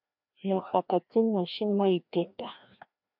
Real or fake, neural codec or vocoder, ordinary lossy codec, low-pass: fake; codec, 16 kHz, 1 kbps, FreqCodec, larger model; MP3, 48 kbps; 5.4 kHz